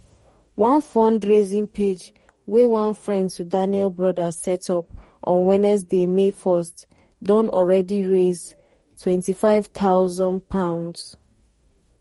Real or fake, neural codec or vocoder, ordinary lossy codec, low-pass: fake; codec, 44.1 kHz, 2.6 kbps, DAC; MP3, 48 kbps; 19.8 kHz